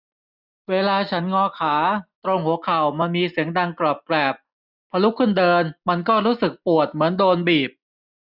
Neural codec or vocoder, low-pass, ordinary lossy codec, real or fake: none; 5.4 kHz; none; real